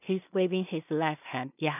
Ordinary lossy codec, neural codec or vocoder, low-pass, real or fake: none; codec, 16 kHz in and 24 kHz out, 0.4 kbps, LongCat-Audio-Codec, two codebook decoder; 3.6 kHz; fake